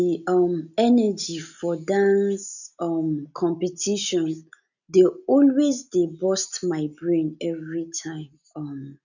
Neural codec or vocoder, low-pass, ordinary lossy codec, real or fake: none; 7.2 kHz; none; real